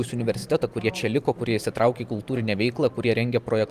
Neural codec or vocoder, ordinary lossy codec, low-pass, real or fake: vocoder, 44.1 kHz, 128 mel bands every 512 samples, BigVGAN v2; Opus, 32 kbps; 14.4 kHz; fake